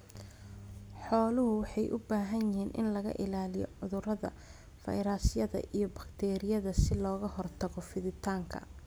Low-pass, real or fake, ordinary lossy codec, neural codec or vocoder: none; real; none; none